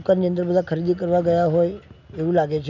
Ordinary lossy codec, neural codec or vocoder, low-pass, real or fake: none; none; 7.2 kHz; real